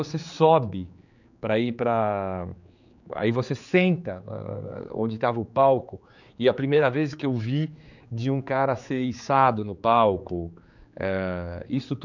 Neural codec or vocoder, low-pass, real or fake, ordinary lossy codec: codec, 16 kHz, 4 kbps, X-Codec, HuBERT features, trained on general audio; 7.2 kHz; fake; none